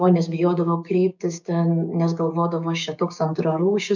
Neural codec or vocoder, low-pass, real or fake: codec, 16 kHz, 6 kbps, DAC; 7.2 kHz; fake